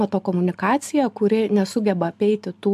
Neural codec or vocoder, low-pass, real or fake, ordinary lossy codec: none; 14.4 kHz; real; AAC, 96 kbps